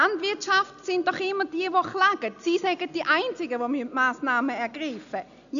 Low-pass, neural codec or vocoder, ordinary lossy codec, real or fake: 7.2 kHz; none; none; real